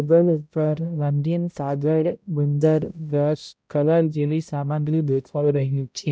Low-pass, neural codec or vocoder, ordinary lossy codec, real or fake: none; codec, 16 kHz, 0.5 kbps, X-Codec, HuBERT features, trained on balanced general audio; none; fake